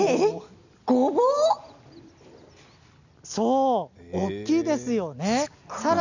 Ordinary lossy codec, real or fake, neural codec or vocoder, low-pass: none; real; none; 7.2 kHz